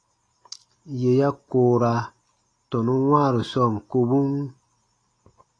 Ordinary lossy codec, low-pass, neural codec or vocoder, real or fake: AAC, 32 kbps; 9.9 kHz; none; real